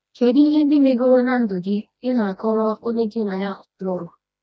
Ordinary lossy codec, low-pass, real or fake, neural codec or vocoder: none; none; fake; codec, 16 kHz, 1 kbps, FreqCodec, smaller model